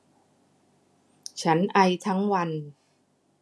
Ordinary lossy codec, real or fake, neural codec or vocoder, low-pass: none; real; none; none